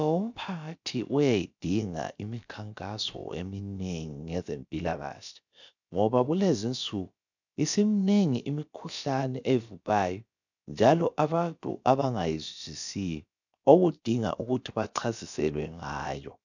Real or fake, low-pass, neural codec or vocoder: fake; 7.2 kHz; codec, 16 kHz, about 1 kbps, DyCAST, with the encoder's durations